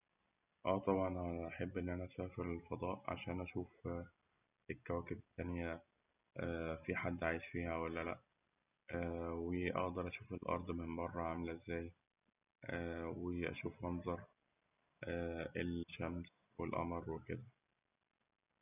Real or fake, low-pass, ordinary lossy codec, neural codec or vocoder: real; 3.6 kHz; none; none